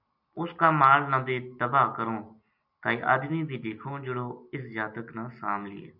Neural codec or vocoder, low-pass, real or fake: none; 5.4 kHz; real